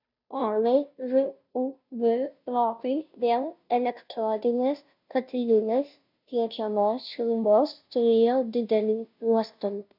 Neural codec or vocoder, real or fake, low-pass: codec, 16 kHz, 0.5 kbps, FunCodec, trained on Chinese and English, 25 frames a second; fake; 5.4 kHz